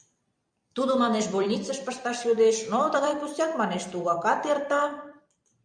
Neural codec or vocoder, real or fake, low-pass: vocoder, 44.1 kHz, 128 mel bands every 256 samples, BigVGAN v2; fake; 9.9 kHz